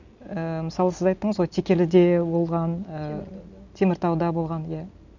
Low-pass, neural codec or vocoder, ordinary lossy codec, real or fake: 7.2 kHz; none; none; real